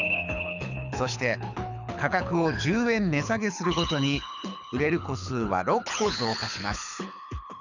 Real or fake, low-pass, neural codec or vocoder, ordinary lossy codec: fake; 7.2 kHz; codec, 24 kHz, 6 kbps, HILCodec; none